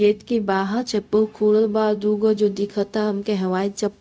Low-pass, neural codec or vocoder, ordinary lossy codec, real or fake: none; codec, 16 kHz, 0.4 kbps, LongCat-Audio-Codec; none; fake